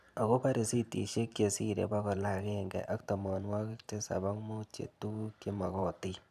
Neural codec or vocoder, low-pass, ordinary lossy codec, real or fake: vocoder, 44.1 kHz, 128 mel bands every 512 samples, BigVGAN v2; 14.4 kHz; none; fake